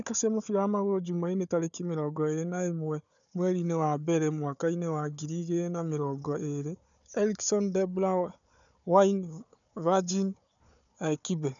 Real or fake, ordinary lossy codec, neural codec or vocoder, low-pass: fake; none; codec, 16 kHz, 4 kbps, FunCodec, trained on Chinese and English, 50 frames a second; 7.2 kHz